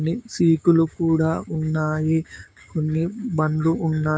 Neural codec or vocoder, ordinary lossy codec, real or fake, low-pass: codec, 16 kHz, 6 kbps, DAC; none; fake; none